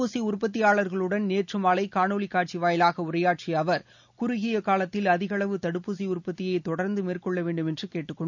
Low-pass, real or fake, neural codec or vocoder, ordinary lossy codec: 7.2 kHz; real; none; none